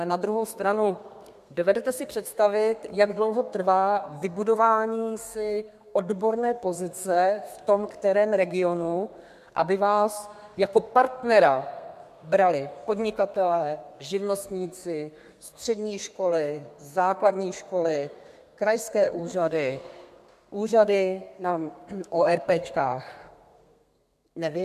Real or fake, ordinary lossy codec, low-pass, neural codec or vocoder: fake; MP3, 96 kbps; 14.4 kHz; codec, 32 kHz, 1.9 kbps, SNAC